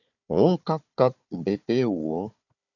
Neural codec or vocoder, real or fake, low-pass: codec, 16 kHz, 4 kbps, FunCodec, trained on Chinese and English, 50 frames a second; fake; 7.2 kHz